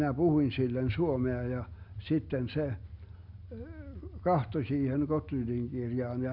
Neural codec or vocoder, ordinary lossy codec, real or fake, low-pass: none; none; real; 5.4 kHz